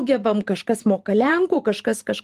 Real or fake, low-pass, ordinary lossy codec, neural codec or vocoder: fake; 14.4 kHz; Opus, 24 kbps; vocoder, 44.1 kHz, 128 mel bands every 512 samples, BigVGAN v2